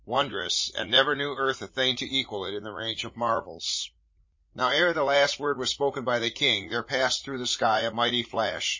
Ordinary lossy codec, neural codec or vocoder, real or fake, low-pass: MP3, 32 kbps; vocoder, 44.1 kHz, 80 mel bands, Vocos; fake; 7.2 kHz